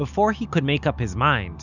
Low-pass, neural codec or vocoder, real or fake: 7.2 kHz; none; real